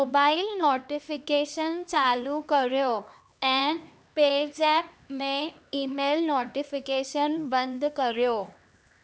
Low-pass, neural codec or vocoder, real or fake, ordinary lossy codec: none; codec, 16 kHz, 2 kbps, X-Codec, HuBERT features, trained on LibriSpeech; fake; none